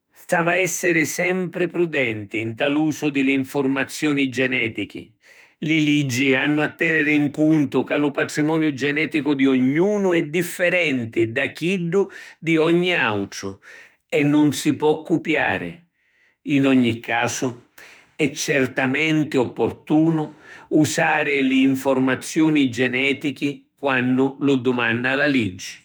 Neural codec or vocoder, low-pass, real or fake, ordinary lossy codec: autoencoder, 48 kHz, 32 numbers a frame, DAC-VAE, trained on Japanese speech; none; fake; none